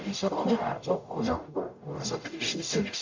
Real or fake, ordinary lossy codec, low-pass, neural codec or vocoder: fake; MP3, 48 kbps; 7.2 kHz; codec, 44.1 kHz, 0.9 kbps, DAC